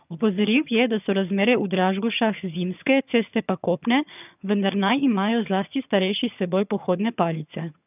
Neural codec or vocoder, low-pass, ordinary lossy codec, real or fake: vocoder, 22.05 kHz, 80 mel bands, HiFi-GAN; 3.6 kHz; none; fake